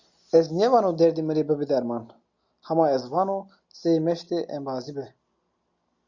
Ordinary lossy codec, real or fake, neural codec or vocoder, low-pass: Opus, 64 kbps; real; none; 7.2 kHz